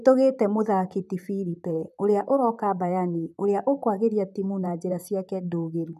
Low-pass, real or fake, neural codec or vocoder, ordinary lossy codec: 14.4 kHz; fake; vocoder, 44.1 kHz, 128 mel bands, Pupu-Vocoder; none